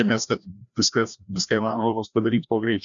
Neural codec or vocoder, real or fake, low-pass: codec, 16 kHz, 1 kbps, FreqCodec, larger model; fake; 7.2 kHz